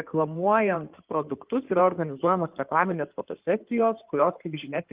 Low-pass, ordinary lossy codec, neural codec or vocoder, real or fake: 3.6 kHz; Opus, 32 kbps; codec, 16 kHz in and 24 kHz out, 2.2 kbps, FireRedTTS-2 codec; fake